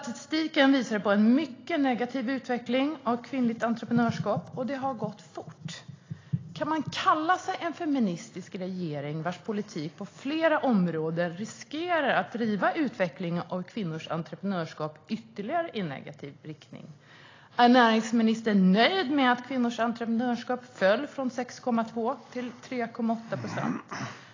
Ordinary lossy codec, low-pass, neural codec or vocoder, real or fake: AAC, 32 kbps; 7.2 kHz; none; real